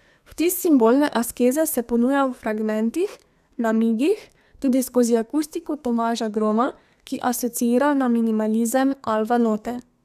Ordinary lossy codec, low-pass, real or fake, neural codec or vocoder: none; 14.4 kHz; fake; codec, 32 kHz, 1.9 kbps, SNAC